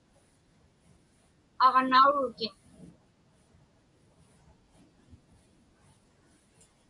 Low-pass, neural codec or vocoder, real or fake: 10.8 kHz; none; real